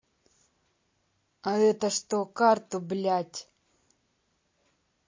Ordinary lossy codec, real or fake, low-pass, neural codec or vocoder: MP3, 32 kbps; real; 7.2 kHz; none